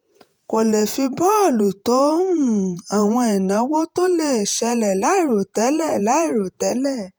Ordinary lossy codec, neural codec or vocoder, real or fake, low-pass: none; vocoder, 48 kHz, 128 mel bands, Vocos; fake; none